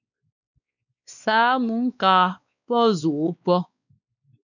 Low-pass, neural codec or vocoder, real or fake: 7.2 kHz; codec, 16 kHz, 2 kbps, X-Codec, WavLM features, trained on Multilingual LibriSpeech; fake